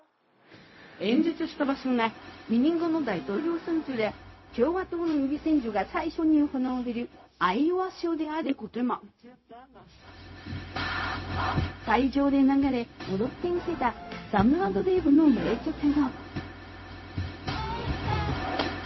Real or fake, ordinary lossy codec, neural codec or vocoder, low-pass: fake; MP3, 24 kbps; codec, 16 kHz, 0.4 kbps, LongCat-Audio-Codec; 7.2 kHz